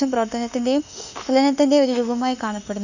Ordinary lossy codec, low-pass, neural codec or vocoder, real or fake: none; 7.2 kHz; autoencoder, 48 kHz, 32 numbers a frame, DAC-VAE, trained on Japanese speech; fake